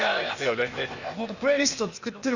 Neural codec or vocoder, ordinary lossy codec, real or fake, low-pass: codec, 16 kHz, 0.8 kbps, ZipCodec; Opus, 64 kbps; fake; 7.2 kHz